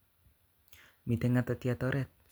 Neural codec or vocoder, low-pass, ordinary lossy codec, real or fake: none; none; none; real